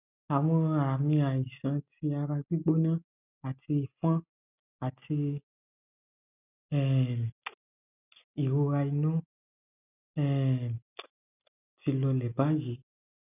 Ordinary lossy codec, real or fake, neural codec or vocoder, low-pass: none; real; none; 3.6 kHz